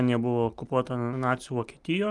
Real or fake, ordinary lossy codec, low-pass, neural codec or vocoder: real; Opus, 32 kbps; 10.8 kHz; none